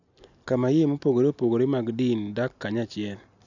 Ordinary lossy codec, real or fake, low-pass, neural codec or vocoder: none; real; 7.2 kHz; none